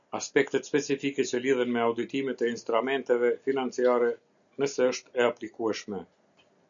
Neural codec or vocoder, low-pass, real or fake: none; 7.2 kHz; real